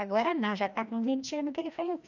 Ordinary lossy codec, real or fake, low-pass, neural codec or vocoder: none; fake; 7.2 kHz; codec, 16 kHz, 1 kbps, FreqCodec, larger model